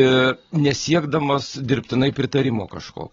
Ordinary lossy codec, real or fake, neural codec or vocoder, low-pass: AAC, 24 kbps; real; none; 7.2 kHz